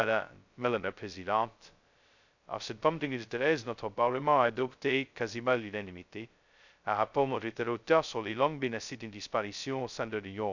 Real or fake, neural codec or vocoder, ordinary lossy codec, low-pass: fake; codec, 16 kHz, 0.2 kbps, FocalCodec; none; 7.2 kHz